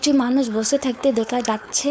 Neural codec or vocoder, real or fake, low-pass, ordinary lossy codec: codec, 16 kHz, 4.8 kbps, FACodec; fake; none; none